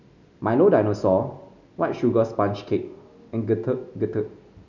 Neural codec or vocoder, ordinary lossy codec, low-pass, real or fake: none; none; 7.2 kHz; real